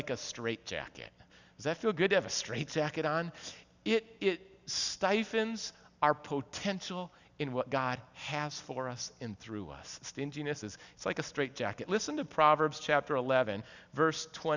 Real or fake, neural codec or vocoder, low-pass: real; none; 7.2 kHz